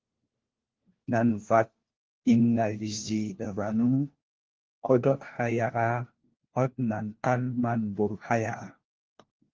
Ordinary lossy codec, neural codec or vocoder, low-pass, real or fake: Opus, 16 kbps; codec, 16 kHz, 1 kbps, FunCodec, trained on LibriTTS, 50 frames a second; 7.2 kHz; fake